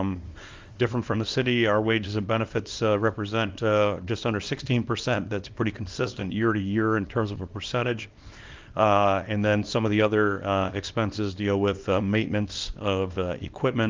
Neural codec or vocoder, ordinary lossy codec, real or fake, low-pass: codec, 24 kHz, 0.9 kbps, WavTokenizer, small release; Opus, 32 kbps; fake; 7.2 kHz